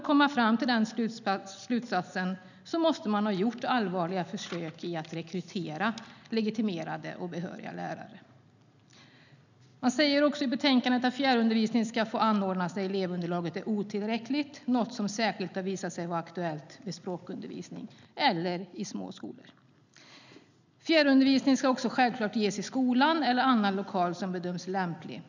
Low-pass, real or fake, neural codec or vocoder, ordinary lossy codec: 7.2 kHz; real; none; none